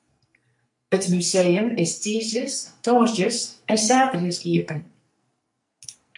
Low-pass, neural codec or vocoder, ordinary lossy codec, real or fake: 10.8 kHz; codec, 44.1 kHz, 2.6 kbps, SNAC; MP3, 64 kbps; fake